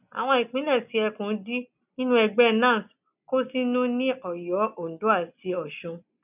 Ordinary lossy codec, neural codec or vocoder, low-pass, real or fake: none; none; 3.6 kHz; real